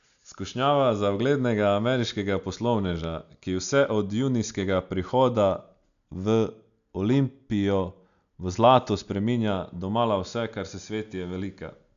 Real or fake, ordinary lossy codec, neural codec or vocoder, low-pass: real; none; none; 7.2 kHz